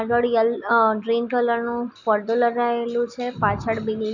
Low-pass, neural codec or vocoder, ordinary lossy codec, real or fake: 7.2 kHz; none; none; real